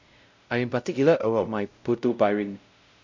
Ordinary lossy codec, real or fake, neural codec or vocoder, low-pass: MP3, 64 kbps; fake; codec, 16 kHz, 0.5 kbps, X-Codec, WavLM features, trained on Multilingual LibriSpeech; 7.2 kHz